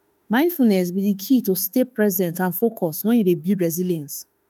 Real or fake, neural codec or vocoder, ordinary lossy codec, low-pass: fake; autoencoder, 48 kHz, 32 numbers a frame, DAC-VAE, trained on Japanese speech; none; none